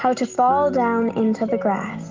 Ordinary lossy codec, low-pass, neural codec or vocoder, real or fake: Opus, 32 kbps; 7.2 kHz; none; real